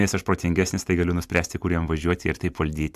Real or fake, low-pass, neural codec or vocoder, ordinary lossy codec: fake; 14.4 kHz; vocoder, 44.1 kHz, 128 mel bands every 256 samples, BigVGAN v2; AAC, 96 kbps